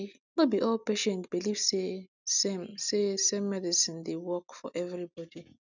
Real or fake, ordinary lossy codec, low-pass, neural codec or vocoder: real; none; 7.2 kHz; none